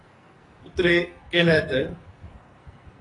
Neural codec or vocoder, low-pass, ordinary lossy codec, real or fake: codec, 44.1 kHz, 2.6 kbps, SNAC; 10.8 kHz; AAC, 32 kbps; fake